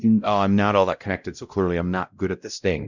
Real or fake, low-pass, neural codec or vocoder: fake; 7.2 kHz; codec, 16 kHz, 0.5 kbps, X-Codec, WavLM features, trained on Multilingual LibriSpeech